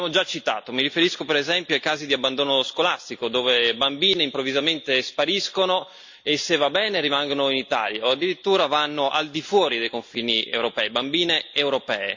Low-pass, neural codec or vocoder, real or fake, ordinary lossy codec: 7.2 kHz; none; real; MP3, 64 kbps